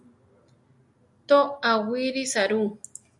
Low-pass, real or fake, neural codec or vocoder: 10.8 kHz; real; none